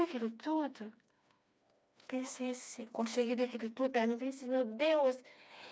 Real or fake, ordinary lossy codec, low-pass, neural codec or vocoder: fake; none; none; codec, 16 kHz, 2 kbps, FreqCodec, smaller model